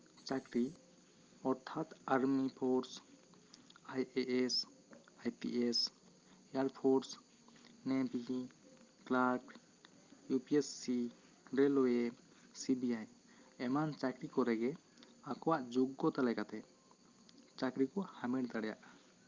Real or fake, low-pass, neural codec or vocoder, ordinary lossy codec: real; 7.2 kHz; none; Opus, 24 kbps